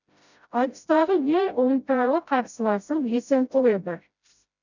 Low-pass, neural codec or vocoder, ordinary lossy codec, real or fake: 7.2 kHz; codec, 16 kHz, 0.5 kbps, FreqCodec, smaller model; none; fake